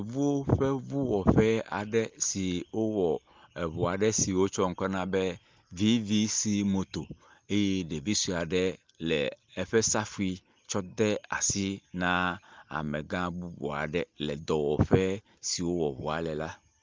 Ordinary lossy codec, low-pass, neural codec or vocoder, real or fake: Opus, 32 kbps; 7.2 kHz; none; real